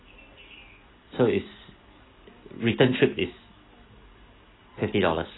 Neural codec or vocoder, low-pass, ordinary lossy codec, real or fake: none; 7.2 kHz; AAC, 16 kbps; real